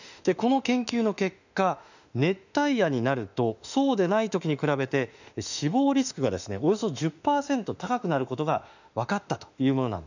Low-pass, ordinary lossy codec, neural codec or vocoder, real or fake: 7.2 kHz; none; autoencoder, 48 kHz, 32 numbers a frame, DAC-VAE, trained on Japanese speech; fake